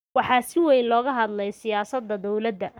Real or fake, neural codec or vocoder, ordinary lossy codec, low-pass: fake; codec, 44.1 kHz, 7.8 kbps, Pupu-Codec; none; none